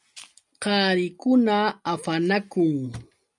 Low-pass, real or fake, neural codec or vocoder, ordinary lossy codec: 10.8 kHz; real; none; MP3, 96 kbps